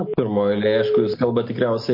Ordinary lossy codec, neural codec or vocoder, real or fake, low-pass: MP3, 32 kbps; none; real; 5.4 kHz